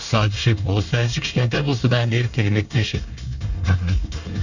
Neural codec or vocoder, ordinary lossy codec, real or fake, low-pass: codec, 24 kHz, 1 kbps, SNAC; none; fake; 7.2 kHz